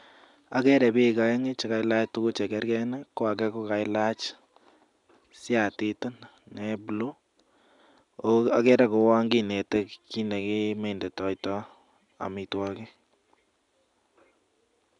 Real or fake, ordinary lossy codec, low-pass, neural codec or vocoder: real; none; 10.8 kHz; none